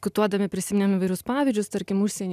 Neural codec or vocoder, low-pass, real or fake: none; 14.4 kHz; real